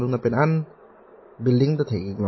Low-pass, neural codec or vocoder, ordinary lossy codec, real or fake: 7.2 kHz; none; MP3, 24 kbps; real